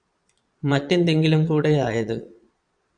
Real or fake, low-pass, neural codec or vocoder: fake; 9.9 kHz; vocoder, 22.05 kHz, 80 mel bands, Vocos